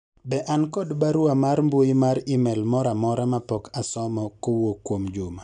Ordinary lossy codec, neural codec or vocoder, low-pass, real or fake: none; none; 9.9 kHz; real